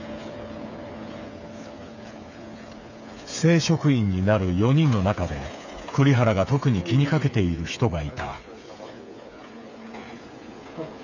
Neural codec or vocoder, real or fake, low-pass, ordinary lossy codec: codec, 16 kHz, 8 kbps, FreqCodec, smaller model; fake; 7.2 kHz; none